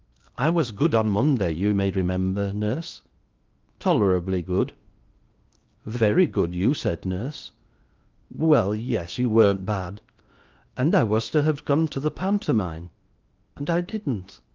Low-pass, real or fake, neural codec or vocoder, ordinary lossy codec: 7.2 kHz; fake; codec, 16 kHz in and 24 kHz out, 0.8 kbps, FocalCodec, streaming, 65536 codes; Opus, 24 kbps